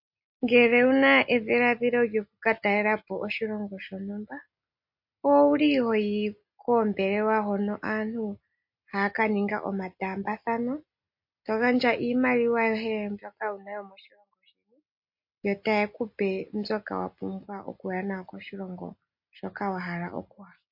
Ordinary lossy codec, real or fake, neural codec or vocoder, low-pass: MP3, 32 kbps; real; none; 5.4 kHz